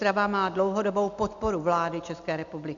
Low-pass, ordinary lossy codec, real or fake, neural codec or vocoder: 7.2 kHz; MP3, 64 kbps; real; none